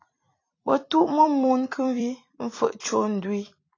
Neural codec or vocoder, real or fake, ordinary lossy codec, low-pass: vocoder, 44.1 kHz, 128 mel bands every 256 samples, BigVGAN v2; fake; AAC, 32 kbps; 7.2 kHz